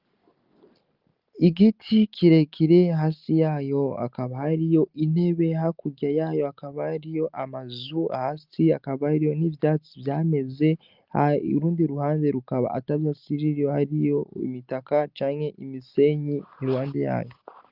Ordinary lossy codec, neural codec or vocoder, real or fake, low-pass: Opus, 24 kbps; none; real; 5.4 kHz